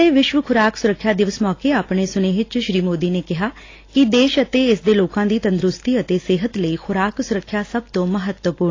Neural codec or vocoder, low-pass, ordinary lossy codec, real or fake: none; 7.2 kHz; AAC, 32 kbps; real